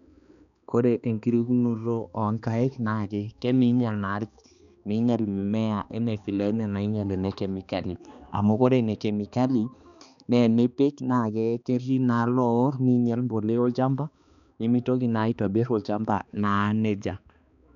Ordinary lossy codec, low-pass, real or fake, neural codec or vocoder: none; 7.2 kHz; fake; codec, 16 kHz, 2 kbps, X-Codec, HuBERT features, trained on balanced general audio